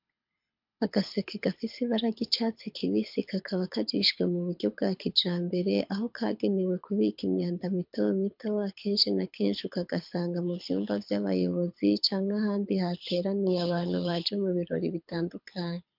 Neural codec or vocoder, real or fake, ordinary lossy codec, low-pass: codec, 24 kHz, 6 kbps, HILCodec; fake; MP3, 48 kbps; 5.4 kHz